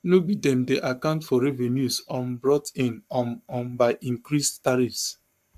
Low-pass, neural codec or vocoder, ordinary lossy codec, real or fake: 14.4 kHz; codec, 44.1 kHz, 7.8 kbps, Pupu-Codec; MP3, 96 kbps; fake